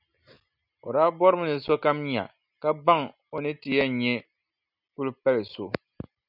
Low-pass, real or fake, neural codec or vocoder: 5.4 kHz; real; none